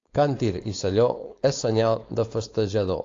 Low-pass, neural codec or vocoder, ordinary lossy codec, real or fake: 7.2 kHz; codec, 16 kHz, 4.8 kbps, FACodec; AAC, 48 kbps; fake